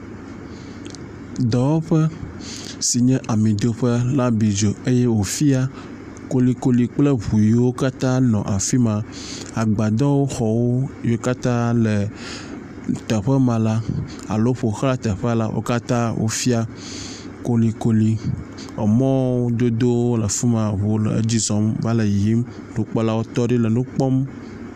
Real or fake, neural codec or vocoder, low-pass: real; none; 14.4 kHz